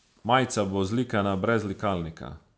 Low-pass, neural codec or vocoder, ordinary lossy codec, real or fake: none; none; none; real